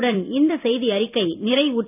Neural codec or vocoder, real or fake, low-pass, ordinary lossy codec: vocoder, 44.1 kHz, 128 mel bands every 256 samples, BigVGAN v2; fake; 3.6 kHz; none